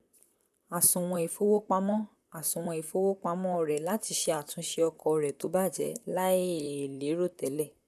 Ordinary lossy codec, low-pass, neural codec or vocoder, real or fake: none; 14.4 kHz; vocoder, 44.1 kHz, 128 mel bands, Pupu-Vocoder; fake